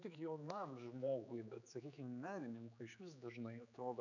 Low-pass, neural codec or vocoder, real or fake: 7.2 kHz; codec, 16 kHz, 4 kbps, X-Codec, HuBERT features, trained on general audio; fake